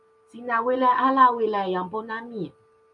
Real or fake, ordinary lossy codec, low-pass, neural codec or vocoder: real; AAC, 64 kbps; 10.8 kHz; none